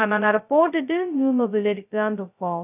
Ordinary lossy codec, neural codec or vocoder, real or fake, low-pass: none; codec, 16 kHz, 0.2 kbps, FocalCodec; fake; 3.6 kHz